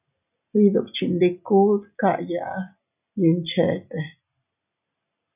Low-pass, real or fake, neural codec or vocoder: 3.6 kHz; real; none